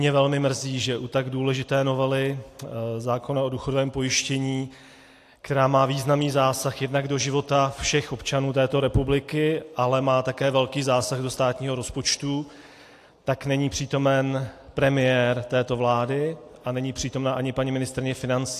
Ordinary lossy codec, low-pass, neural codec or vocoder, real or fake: AAC, 64 kbps; 14.4 kHz; none; real